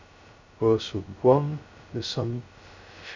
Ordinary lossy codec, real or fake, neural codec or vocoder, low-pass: MP3, 64 kbps; fake; codec, 16 kHz, 0.2 kbps, FocalCodec; 7.2 kHz